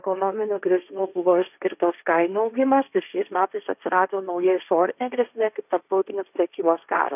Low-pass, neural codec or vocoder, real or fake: 3.6 kHz; codec, 16 kHz, 1.1 kbps, Voila-Tokenizer; fake